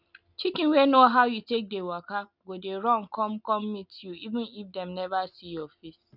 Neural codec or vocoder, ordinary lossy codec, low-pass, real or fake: none; none; 5.4 kHz; real